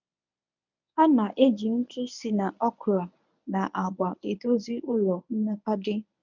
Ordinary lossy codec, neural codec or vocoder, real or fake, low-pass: none; codec, 24 kHz, 0.9 kbps, WavTokenizer, medium speech release version 1; fake; 7.2 kHz